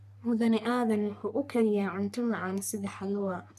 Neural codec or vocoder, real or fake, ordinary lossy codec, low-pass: codec, 44.1 kHz, 3.4 kbps, Pupu-Codec; fake; none; 14.4 kHz